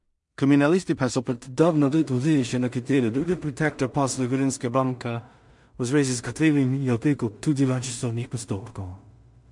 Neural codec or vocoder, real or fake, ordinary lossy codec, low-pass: codec, 16 kHz in and 24 kHz out, 0.4 kbps, LongCat-Audio-Codec, two codebook decoder; fake; MP3, 64 kbps; 10.8 kHz